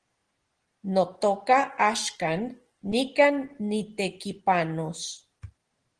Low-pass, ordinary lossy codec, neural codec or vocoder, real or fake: 9.9 kHz; Opus, 16 kbps; none; real